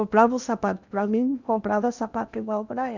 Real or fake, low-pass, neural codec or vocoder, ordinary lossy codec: fake; 7.2 kHz; codec, 16 kHz in and 24 kHz out, 0.8 kbps, FocalCodec, streaming, 65536 codes; none